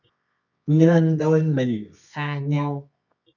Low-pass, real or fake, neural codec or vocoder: 7.2 kHz; fake; codec, 24 kHz, 0.9 kbps, WavTokenizer, medium music audio release